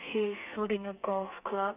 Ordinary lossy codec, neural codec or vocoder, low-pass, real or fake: none; codec, 32 kHz, 1.9 kbps, SNAC; 3.6 kHz; fake